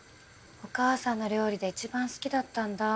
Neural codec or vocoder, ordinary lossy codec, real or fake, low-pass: none; none; real; none